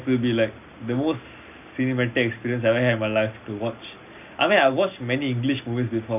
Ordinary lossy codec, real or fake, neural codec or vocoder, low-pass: none; real; none; 3.6 kHz